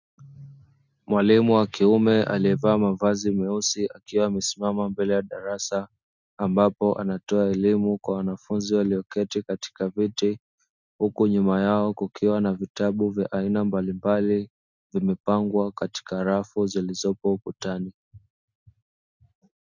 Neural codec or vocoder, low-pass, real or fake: none; 7.2 kHz; real